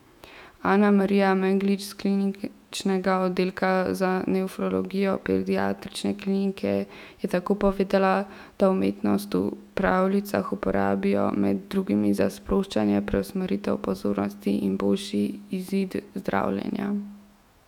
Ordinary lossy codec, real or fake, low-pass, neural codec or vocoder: none; fake; 19.8 kHz; autoencoder, 48 kHz, 128 numbers a frame, DAC-VAE, trained on Japanese speech